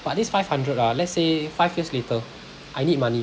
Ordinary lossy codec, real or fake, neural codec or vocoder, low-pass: none; real; none; none